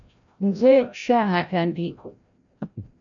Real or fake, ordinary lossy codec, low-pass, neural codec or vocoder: fake; MP3, 96 kbps; 7.2 kHz; codec, 16 kHz, 0.5 kbps, FreqCodec, larger model